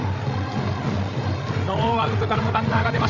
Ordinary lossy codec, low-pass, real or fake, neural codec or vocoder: none; 7.2 kHz; fake; codec, 16 kHz, 8 kbps, FreqCodec, larger model